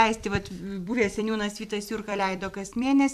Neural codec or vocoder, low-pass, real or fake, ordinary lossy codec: vocoder, 44.1 kHz, 128 mel bands every 256 samples, BigVGAN v2; 14.4 kHz; fake; AAC, 96 kbps